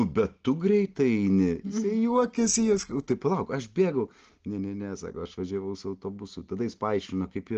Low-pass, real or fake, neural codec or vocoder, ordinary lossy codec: 7.2 kHz; real; none; Opus, 16 kbps